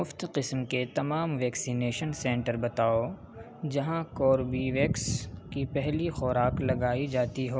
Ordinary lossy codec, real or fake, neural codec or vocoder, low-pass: none; real; none; none